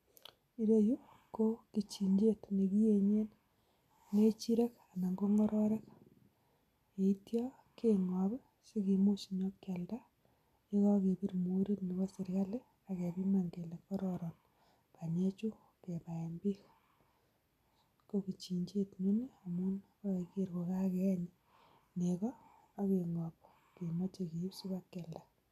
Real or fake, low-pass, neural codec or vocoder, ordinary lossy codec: real; 14.4 kHz; none; none